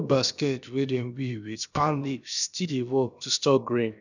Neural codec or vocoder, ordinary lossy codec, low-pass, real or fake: codec, 16 kHz, about 1 kbps, DyCAST, with the encoder's durations; none; 7.2 kHz; fake